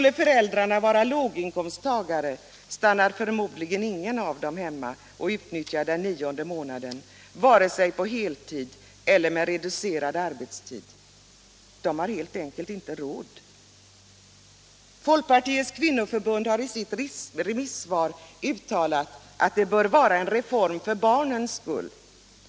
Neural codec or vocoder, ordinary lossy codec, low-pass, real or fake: none; none; none; real